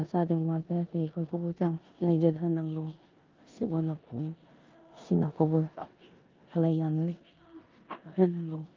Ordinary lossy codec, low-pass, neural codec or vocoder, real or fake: Opus, 24 kbps; 7.2 kHz; codec, 16 kHz in and 24 kHz out, 0.9 kbps, LongCat-Audio-Codec, four codebook decoder; fake